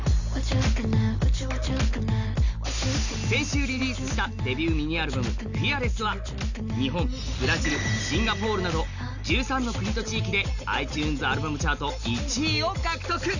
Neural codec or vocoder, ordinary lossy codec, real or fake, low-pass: none; none; real; 7.2 kHz